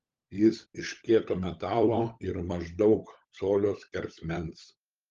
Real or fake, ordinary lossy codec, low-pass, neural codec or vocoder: fake; Opus, 32 kbps; 7.2 kHz; codec, 16 kHz, 16 kbps, FunCodec, trained on LibriTTS, 50 frames a second